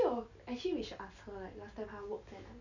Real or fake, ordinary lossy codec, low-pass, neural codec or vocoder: real; none; 7.2 kHz; none